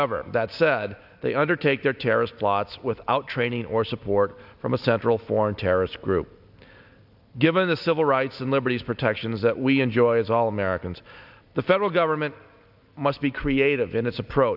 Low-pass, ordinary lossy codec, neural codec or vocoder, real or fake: 5.4 kHz; AAC, 48 kbps; none; real